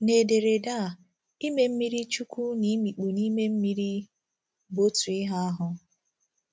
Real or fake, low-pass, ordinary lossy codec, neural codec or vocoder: real; none; none; none